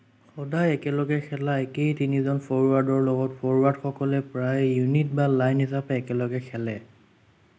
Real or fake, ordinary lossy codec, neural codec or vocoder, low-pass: real; none; none; none